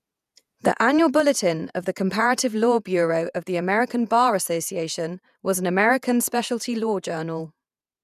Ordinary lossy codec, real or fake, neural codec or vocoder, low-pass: none; fake; vocoder, 48 kHz, 128 mel bands, Vocos; 14.4 kHz